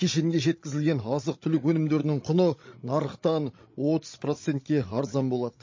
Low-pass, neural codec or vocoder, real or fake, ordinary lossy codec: 7.2 kHz; codec, 16 kHz, 16 kbps, FreqCodec, larger model; fake; MP3, 32 kbps